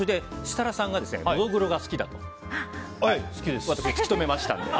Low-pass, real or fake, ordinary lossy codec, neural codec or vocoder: none; real; none; none